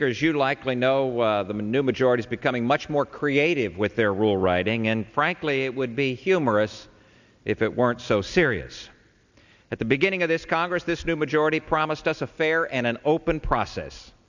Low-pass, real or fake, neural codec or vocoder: 7.2 kHz; real; none